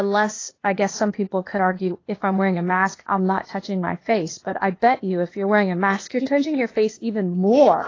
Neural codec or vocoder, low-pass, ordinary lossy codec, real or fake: codec, 16 kHz, 0.8 kbps, ZipCodec; 7.2 kHz; AAC, 32 kbps; fake